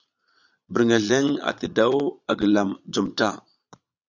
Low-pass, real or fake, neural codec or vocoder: 7.2 kHz; fake; vocoder, 22.05 kHz, 80 mel bands, Vocos